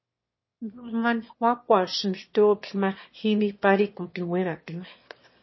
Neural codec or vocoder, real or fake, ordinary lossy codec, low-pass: autoencoder, 22.05 kHz, a latent of 192 numbers a frame, VITS, trained on one speaker; fake; MP3, 24 kbps; 7.2 kHz